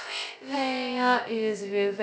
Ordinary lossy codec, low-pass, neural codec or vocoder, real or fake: none; none; codec, 16 kHz, 0.2 kbps, FocalCodec; fake